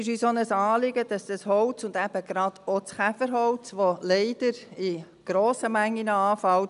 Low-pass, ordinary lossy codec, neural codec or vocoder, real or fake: 10.8 kHz; none; none; real